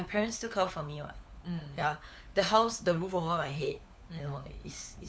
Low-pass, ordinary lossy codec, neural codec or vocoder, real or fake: none; none; codec, 16 kHz, 4 kbps, FunCodec, trained on LibriTTS, 50 frames a second; fake